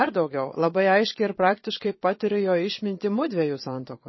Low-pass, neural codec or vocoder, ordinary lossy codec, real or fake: 7.2 kHz; vocoder, 22.05 kHz, 80 mel bands, Vocos; MP3, 24 kbps; fake